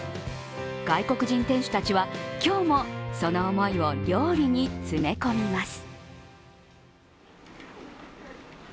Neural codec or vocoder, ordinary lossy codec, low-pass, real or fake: none; none; none; real